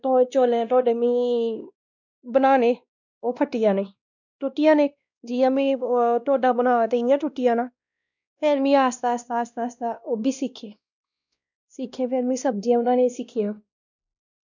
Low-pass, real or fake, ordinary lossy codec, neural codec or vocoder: 7.2 kHz; fake; none; codec, 16 kHz, 1 kbps, X-Codec, WavLM features, trained on Multilingual LibriSpeech